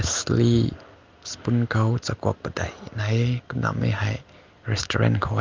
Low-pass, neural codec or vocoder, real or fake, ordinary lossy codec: 7.2 kHz; none; real; Opus, 32 kbps